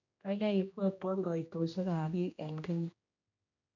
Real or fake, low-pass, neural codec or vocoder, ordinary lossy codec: fake; 7.2 kHz; codec, 16 kHz, 1 kbps, X-Codec, HuBERT features, trained on general audio; none